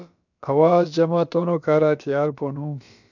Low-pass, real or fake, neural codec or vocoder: 7.2 kHz; fake; codec, 16 kHz, about 1 kbps, DyCAST, with the encoder's durations